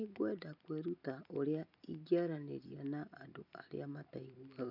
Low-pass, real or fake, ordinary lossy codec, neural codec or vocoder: 5.4 kHz; real; none; none